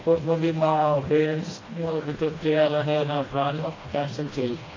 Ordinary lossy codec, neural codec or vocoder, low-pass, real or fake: AAC, 32 kbps; codec, 16 kHz, 1 kbps, FreqCodec, smaller model; 7.2 kHz; fake